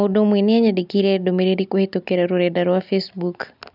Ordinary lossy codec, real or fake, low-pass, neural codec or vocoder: none; real; 5.4 kHz; none